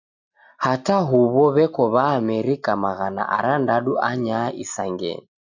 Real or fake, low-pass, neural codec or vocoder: real; 7.2 kHz; none